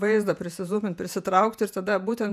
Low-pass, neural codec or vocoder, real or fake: 14.4 kHz; vocoder, 48 kHz, 128 mel bands, Vocos; fake